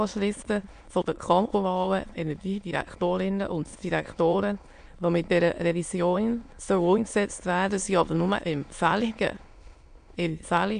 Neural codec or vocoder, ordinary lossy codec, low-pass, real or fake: autoencoder, 22.05 kHz, a latent of 192 numbers a frame, VITS, trained on many speakers; AAC, 64 kbps; 9.9 kHz; fake